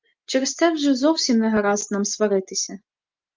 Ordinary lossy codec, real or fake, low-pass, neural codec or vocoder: Opus, 32 kbps; real; 7.2 kHz; none